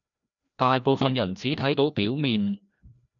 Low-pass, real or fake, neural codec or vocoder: 7.2 kHz; fake; codec, 16 kHz, 1 kbps, FreqCodec, larger model